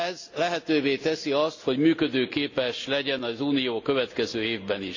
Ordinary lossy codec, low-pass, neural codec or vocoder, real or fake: AAC, 48 kbps; 7.2 kHz; none; real